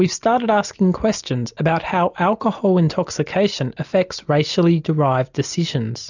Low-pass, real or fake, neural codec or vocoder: 7.2 kHz; real; none